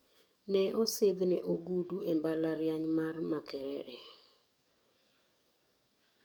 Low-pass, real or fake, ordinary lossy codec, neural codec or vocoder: 19.8 kHz; fake; MP3, 96 kbps; codec, 44.1 kHz, 7.8 kbps, DAC